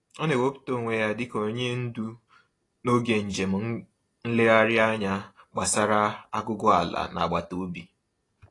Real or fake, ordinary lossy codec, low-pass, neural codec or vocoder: real; AAC, 32 kbps; 10.8 kHz; none